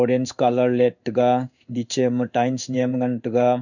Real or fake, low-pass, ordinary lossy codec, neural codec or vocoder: fake; 7.2 kHz; none; codec, 16 kHz in and 24 kHz out, 1 kbps, XY-Tokenizer